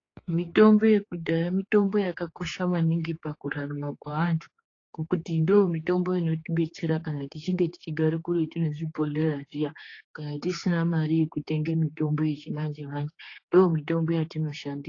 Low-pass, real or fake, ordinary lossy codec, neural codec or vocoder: 7.2 kHz; fake; AAC, 32 kbps; codec, 16 kHz, 4 kbps, X-Codec, HuBERT features, trained on general audio